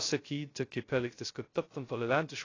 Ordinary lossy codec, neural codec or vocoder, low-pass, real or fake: AAC, 32 kbps; codec, 16 kHz, 0.2 kbps, FocalCodec; 7.2 kHz; fake